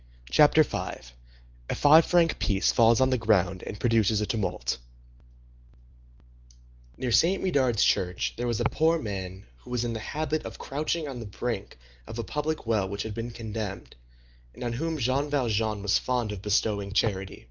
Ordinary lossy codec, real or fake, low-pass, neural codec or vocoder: Opus, 32 kbps; real; 7.2 kHz; none